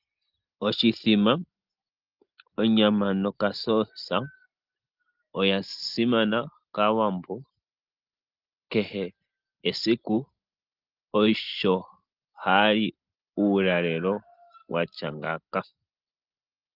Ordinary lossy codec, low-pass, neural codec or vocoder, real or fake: Opus, 32 kbps; 5.4 kHz; none; real